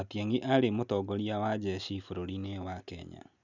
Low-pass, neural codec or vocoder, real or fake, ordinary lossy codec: 7.2 kHz; none; real; none